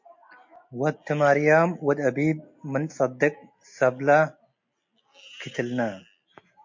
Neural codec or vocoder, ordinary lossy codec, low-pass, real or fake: none; MP3, 48 kbps; 7.2 kHz; real